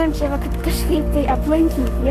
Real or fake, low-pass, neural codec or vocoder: fake; 14.4 kHz; codec, 44.1 kHz, 7.8 kbps, Pupu-Codec